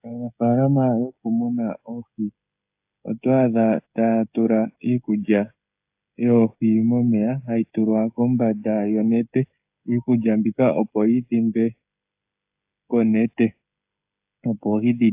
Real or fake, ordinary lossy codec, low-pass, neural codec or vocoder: fake; AAC, 32 kbps; 3.6 kHz; codec, 16 kHz, 16 kbps, FreqCodec, smaller model